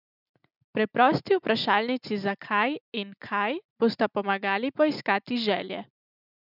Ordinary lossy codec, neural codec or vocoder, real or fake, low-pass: none; none; real; 5.4 kHz